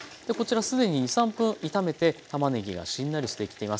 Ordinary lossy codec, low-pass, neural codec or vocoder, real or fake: none; none; none; real